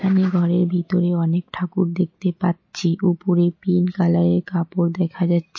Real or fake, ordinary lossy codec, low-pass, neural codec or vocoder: real; MP3, 32 kbps; 7.2 kHz; none